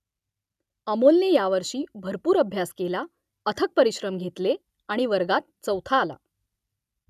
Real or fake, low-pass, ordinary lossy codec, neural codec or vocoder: real; 14.4 kHz; none; none